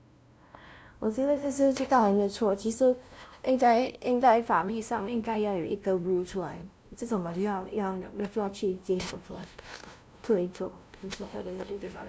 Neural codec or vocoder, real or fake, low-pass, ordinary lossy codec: codec, 16 kHz, 0.5 kbps, FunCodec, trained on LibriTTS, 25 frames a second; fake; none; none